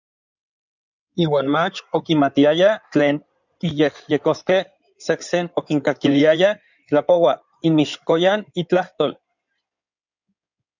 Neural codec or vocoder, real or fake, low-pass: codec, 16 kHz in and 24 kHz out, 2.2 kbps, FireRedTTS-2 codec; fake; 7.2 kHz